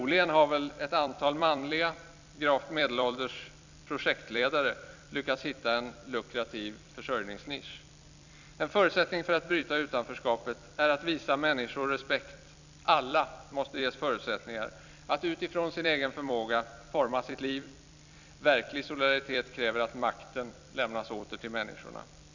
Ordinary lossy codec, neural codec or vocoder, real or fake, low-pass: none; vocoder, 44.1 kHz, 128 mel bands every 256 samples, BigVGAN v2; fake; 7.2 kHz